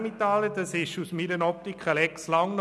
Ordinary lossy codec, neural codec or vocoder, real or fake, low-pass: none; none; real; none